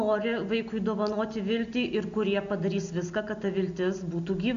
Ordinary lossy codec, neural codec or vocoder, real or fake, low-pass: MP3, 64 kbps; none; real; 7.2 kHz